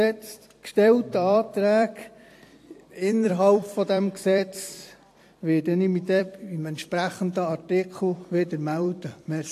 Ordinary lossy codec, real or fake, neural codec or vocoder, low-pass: AAC, 64 kbps; fake; vocoder, 44.1 kHz, 128 mel bands every 256 samples, BigVGAN v2; 14.4 kHz